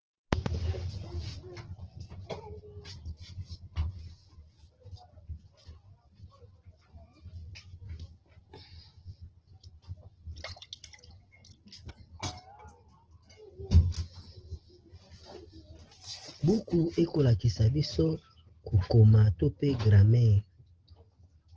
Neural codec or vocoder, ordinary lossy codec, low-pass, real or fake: none; Opus, 16 kbps; 7.2 kHz; real